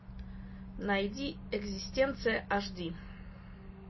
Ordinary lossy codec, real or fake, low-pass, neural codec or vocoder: MP3, 24 kbps; real; 7.2 kHz; none